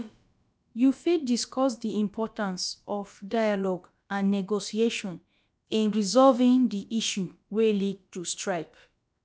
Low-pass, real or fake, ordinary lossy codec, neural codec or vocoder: none; fake; none; codec, 16 kHz, about 1 kbps, DyCAST, with the encoder's durations